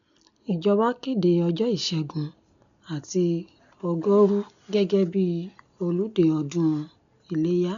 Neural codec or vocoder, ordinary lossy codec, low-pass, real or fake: none; MP3, 96 kbps; 7.2 kHz; real